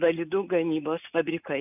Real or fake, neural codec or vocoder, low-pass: real; none; 3.6 kHz